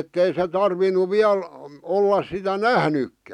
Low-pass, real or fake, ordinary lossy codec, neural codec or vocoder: 19.8 kHz; real; none; none